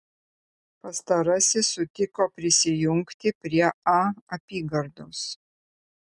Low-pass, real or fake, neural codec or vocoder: 10.8 kHz; real; none